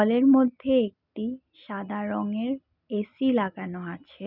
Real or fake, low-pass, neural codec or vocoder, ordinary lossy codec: real; 5.4 kHz; none; none